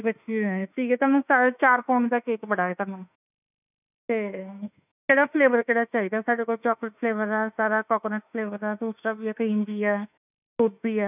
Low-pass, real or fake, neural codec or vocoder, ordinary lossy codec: 3.6 kHz; fake; autoencoder, 48 kHz, 32 numbers a frame, DAC-VAE, trained on Japanese speech; none